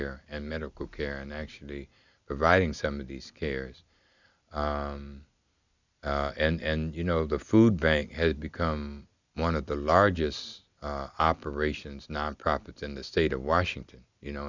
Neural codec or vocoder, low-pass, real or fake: none; 7.2 kHz; real